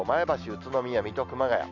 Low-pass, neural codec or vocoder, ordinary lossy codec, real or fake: 7.2 kHz; none; none; real